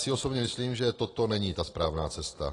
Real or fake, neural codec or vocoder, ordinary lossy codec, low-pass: real; none; AAC, 32 kbps; 10.8 kHz